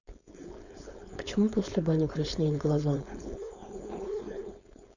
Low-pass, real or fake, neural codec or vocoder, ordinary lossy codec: 7.2 kHz; fake; codec, 16 kHz, 4.8 kbps, FACodec; none